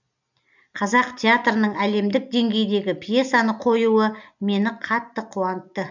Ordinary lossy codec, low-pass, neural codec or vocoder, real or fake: none; 7.2 kHz; none; real